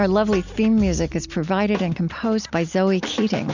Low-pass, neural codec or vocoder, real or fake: 7.2 kHz; none; real